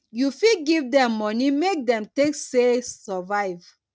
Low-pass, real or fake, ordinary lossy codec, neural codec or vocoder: none; real; none; none